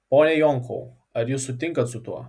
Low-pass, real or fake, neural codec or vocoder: 9.9 kHz; real; none